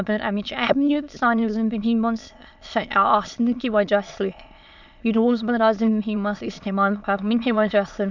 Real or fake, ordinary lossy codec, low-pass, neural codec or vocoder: fake; none; 7.2 kHz; autoencoder, 22.05 kHz, a latent of 192 numbers a frame, VITS, trained on many speakers